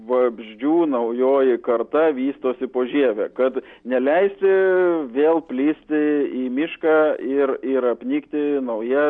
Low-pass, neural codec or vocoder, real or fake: 9.9 kHz; none; real